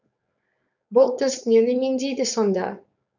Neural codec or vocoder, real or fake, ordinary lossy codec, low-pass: codec, 16 kHz, 4.8 kbps, FACodec; fake; none; 7.2 kHz